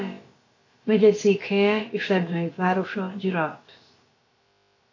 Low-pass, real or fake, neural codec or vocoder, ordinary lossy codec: 7.2 kHz; fake; codec, 16 kHz, about 1 kbps, DyCAST, with the encoder's durations; AAC, 32 kbps